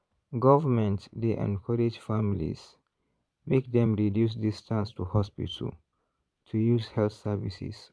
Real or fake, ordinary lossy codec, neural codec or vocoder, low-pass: fake; none; vocoder, 22.05 kHz, 80 mel bands, Vocos; none